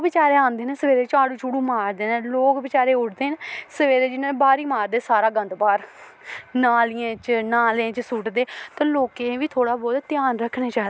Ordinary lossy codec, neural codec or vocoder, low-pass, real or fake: none; none; none; real